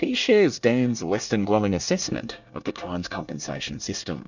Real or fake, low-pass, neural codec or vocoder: fake; 7.2 kHz; codec, 24 kHz, 1 kbps, SNAC